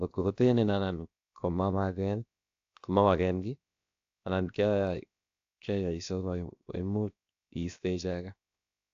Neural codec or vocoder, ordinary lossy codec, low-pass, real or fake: codec, 16 kHz, 0.7 kbps, FocalCodec; AAC, 64 kbps; 7.2 kHz; fake